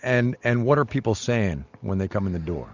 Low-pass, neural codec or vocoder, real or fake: 7.2 kHz; none; real